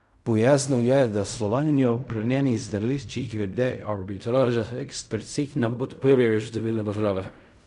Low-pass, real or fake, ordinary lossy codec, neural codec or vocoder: 10.8 kHz; fake; none; codec, 16 kHz in and 24 kHz out, 0.4 kbps, LongCat-Audio-Codec, fine tuned four codebook decoder